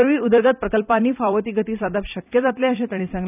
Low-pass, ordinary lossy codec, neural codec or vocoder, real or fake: 3.6 kHz; none; vocoder, 44.1 kHz, 128 mel bands every 256 samples, BigVGAN v2; fake